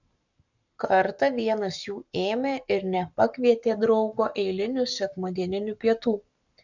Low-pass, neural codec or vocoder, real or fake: 7.2 kHz; codec, 44.1 kHz, 7.8 kbps, Pupu-Codec; fake